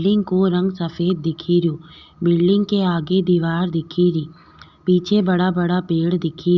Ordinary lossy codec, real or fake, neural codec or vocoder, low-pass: Opus, 64 kbps; real; none; 7.2 kHz